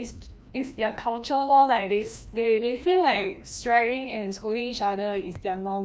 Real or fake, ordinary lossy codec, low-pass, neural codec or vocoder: fake; none; none; codec, 16 kHz, 1 kbps, FreqCodec, larger model